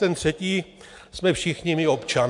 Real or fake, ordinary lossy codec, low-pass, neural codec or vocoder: real; MP3, 64 kbps; 10.8 kHz; none